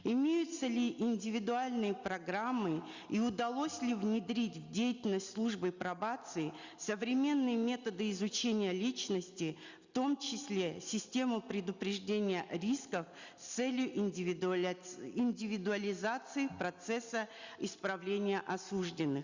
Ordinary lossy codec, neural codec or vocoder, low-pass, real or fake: Opus, 64 kbps; none; 7.2 kHz; real